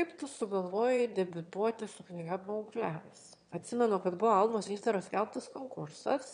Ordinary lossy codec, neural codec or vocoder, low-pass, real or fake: MP3, 64 kbps; autoencoder, 22.05 kHz, a latent of 192 numbers a frame, VITS, trained on one speaker; 9.9 kHz; fake